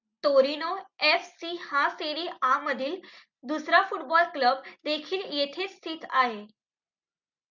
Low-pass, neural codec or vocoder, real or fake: 7.2 kHz; none; real